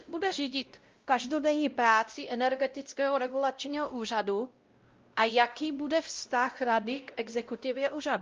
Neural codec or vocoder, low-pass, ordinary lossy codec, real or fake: codec, 16 kHz, 0.5 kbps, X-Codec, WavLM features, trained on Multilingual LibriSpeech; 7.2 kHz; Opus, 24 kbps; fake